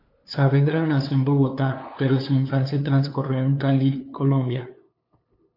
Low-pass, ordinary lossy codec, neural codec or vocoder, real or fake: 5.4 kHz; AAC, 32 kbps; codec, 16 kHz, 2 kbps, FunCodec, trained on LibriTTS, 25 frames a second; fake